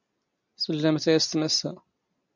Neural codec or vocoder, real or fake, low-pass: none; real; 7.2 kHz